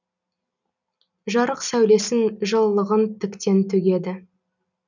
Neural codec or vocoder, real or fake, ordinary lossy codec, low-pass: none; real; none; 7.2 kHz